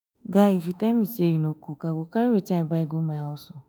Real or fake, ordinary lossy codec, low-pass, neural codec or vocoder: fake; none; none; autoencoder, 48 kHz, 32 numbers a frame, DAC-VAE, trained on Japanese speech